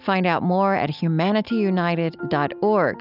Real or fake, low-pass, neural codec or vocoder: real; 5.4 kHz; none